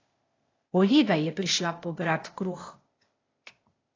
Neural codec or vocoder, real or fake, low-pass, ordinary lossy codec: codec, 16 kHz, 0.8 kbps, ZipCodec; fake; 7.2 kHz; AAC, 32 kbps